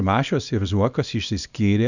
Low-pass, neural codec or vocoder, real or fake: 7.2 kHz; codec, 24 kHz, 0.9 kbps, WavTokenizer, small release; fake